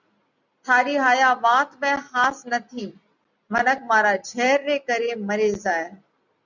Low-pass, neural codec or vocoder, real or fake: 7.2 kHz; none; real